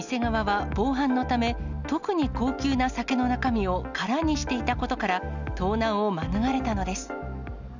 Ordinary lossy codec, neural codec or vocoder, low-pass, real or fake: none; none; 7.2 kHz; real